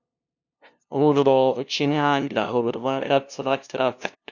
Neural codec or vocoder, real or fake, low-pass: codec, 16 kHz, 0.5 kbps, FunCodec, trained on LibriTTS, 25 frames a second; fake; 7.2 kHz